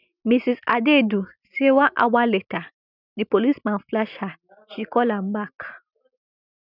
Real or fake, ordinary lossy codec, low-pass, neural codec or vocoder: real; none; 5.4 kHz; none